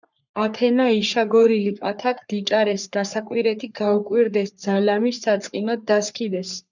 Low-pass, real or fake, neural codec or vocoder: 7.2 kHz; fake; codec, 44.1 kHz, 3.4 kbps, Pupu-Codec